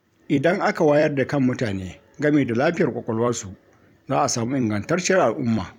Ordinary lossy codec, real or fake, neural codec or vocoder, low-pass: none; fake; vocoder, 44.1 kHz, 128 mel bands every 256 samples, BigVGAN v2; 19.8 kHz